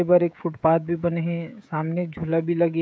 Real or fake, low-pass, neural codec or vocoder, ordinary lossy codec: fake; none; codec, 16 kHz, 16 kbps, FreqCodec, smaller model; none